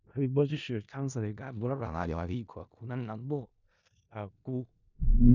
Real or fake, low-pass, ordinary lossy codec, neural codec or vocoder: fake; 7.2 kHz; none; codec, 16 kHz in and 24 kHz out, 0.4 kbps, LongCat-Audio-Codec, four codebook decoder